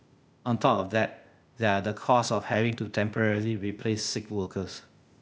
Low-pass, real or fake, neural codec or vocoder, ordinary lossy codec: none; fake; codec, 16 kHz, 0.8 kbps, ZipCodec; none